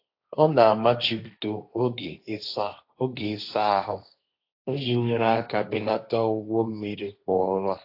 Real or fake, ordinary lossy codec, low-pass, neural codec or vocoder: fake; AAC, 32 kbps; 5.4 kHz; codec, 16 kHz, 1.1 kbps, Voila-Tokenizer